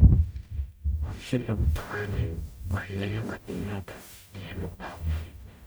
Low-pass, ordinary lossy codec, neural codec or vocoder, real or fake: none; none; codec, 44.1 kHz, 0.9 kbps, DAC; fake